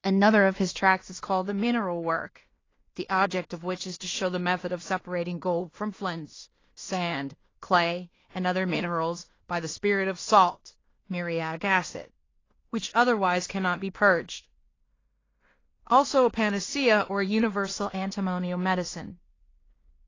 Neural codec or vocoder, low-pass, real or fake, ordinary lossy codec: codec, 16 kHz in and 24 kHz out, 0.4 kbps, LongCat-Audio-Codec, two codebook decoder; 7.2 kHz; fake; AAC, 32 kbps